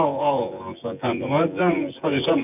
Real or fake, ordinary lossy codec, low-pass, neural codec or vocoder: fake; none; 3.6 kHz; vocoder, 24 kHz, 100 mel bands, Vocos